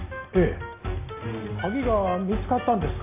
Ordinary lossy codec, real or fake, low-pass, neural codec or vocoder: AAC, 24 kbps; real; 3.6 kHz; none